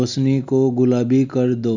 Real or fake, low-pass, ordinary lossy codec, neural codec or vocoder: real; 7.2 kHz; Opus, 64 kbps; none